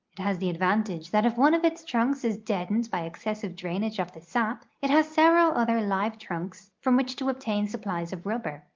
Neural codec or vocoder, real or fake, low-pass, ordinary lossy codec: none; real; 7.2 kHz; Opus, 24 kbps